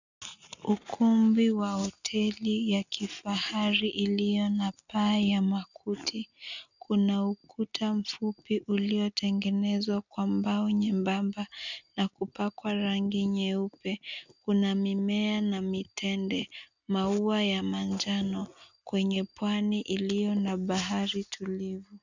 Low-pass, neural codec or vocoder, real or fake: 7.2 kHz; none; real